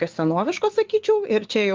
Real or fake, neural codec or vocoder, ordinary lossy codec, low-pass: fake; vocoder, 24 kHz, 100 mel bands, Vocos; Opus, 32 kbps; 7.2 kHz